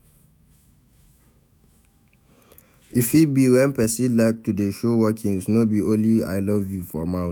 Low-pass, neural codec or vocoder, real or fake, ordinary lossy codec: none; autoencoder, 48 kHz, 128 numbers a frame, DAC-VAE, trained on Japanese speech; fake; none